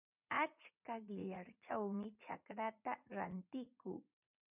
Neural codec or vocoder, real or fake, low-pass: vocoder, 44.1 kHz, 80 mel bands, Vocos; fake; 3.6 kHz